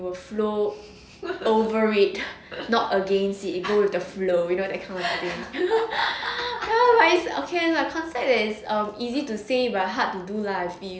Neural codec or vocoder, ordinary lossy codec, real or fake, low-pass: none; none; real; none